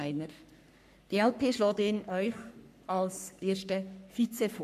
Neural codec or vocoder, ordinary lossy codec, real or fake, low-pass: codec, 44.1 kHz, 3.4 kbps, Pupu-Codec; MP3, 96 kbps; fake; 14.4 kHz